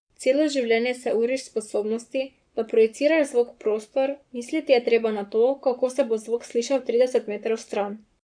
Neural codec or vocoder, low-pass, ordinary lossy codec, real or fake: codec, 44.1 kHz, 7.8 kbps, Pupu-Codec; 9.9 kHz; none; fake